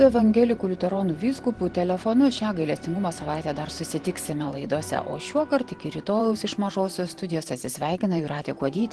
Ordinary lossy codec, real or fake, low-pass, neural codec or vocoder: Opus, 16 kbps; fake; 10.8 kHz; vocoder, 44.1 kHz, 128 mel bands every 512 samples, BigVGAN v2